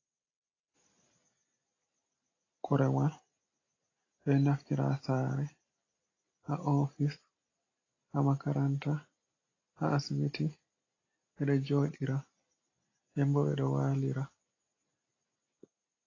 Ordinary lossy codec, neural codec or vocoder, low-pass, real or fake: AAC, 32 kbps; none; 7.2 kHz; real